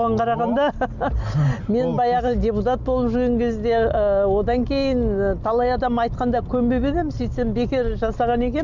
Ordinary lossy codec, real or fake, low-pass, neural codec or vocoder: none; real; 7.2 kHz; none